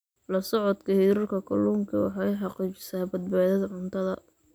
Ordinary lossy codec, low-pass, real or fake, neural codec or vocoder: none; none; real; none